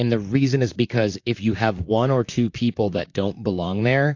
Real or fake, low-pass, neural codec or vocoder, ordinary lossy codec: fake; 7.2 kHz; vocoder, 44.1 kHz, 128 mel bands, Pupu-Vocoder; AAC, 48 kbps